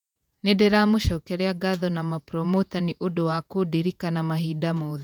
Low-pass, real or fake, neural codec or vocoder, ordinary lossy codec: 19.8 kHz; fake; vocoder, 48 kHz, 128 mel bands, Vocos; none